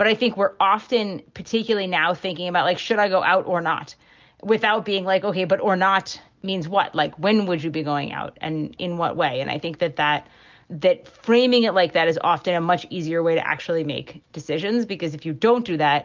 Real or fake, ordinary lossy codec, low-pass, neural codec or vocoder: real; Opus, 24 kbps; 7.2 kHz; none